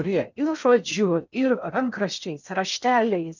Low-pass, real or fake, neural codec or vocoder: 7.2 kHz; fake; codec, 16 kHz in and 24 kHz out, 0.6 kbps, FocalCodec, streaming, 2048 codes